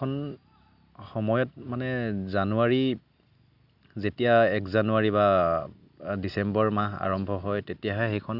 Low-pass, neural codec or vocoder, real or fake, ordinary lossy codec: 5.4 kHz; none; real; none